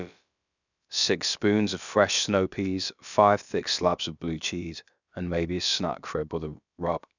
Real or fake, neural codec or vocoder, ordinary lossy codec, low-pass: fake; codec, 16 kHz, about 1 kbps, DyCAST, with the encoder's durations; none; 7.2 kHz